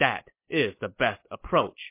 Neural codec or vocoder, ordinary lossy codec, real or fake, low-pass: none; MP3, 24 kbps; real; 3.6 kHz